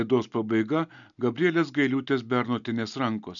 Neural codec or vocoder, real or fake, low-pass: none; real; 7.2 kHz